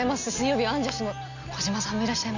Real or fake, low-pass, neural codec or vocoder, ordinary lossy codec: real; 7.2 kHz; none; none